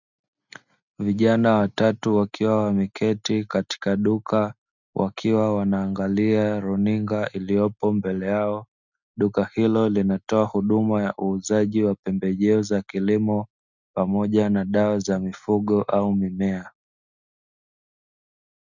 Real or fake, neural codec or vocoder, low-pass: real; none; 7.2 kHz